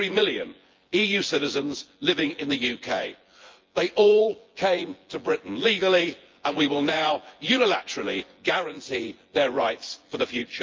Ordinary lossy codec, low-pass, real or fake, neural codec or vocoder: Opus, 32 kbps; 7.2 kHz; fake; vocoder, 24 kHz, 100 mel bands, Vocos